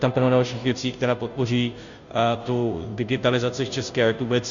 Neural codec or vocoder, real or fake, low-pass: codec, 16 kHz, 0.5 kbps, FunCodec, trained on Chinese and English, 25 frames a second; fake; 7.2 kHz